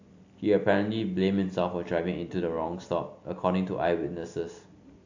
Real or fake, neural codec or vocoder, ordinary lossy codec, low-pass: real; none; AAC, 48 kbps; 7.2 kHz